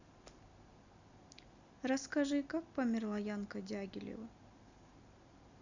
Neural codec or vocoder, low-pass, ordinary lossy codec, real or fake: none; 7.2 kHz; Opus, 64 kbps; real